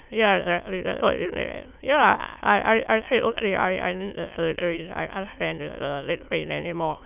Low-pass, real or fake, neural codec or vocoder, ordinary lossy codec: 3.6 kHz; fake; autoencoder, 22.05 kHz, a latent of 192 numbers a frame, VITS, trained on many speakers; none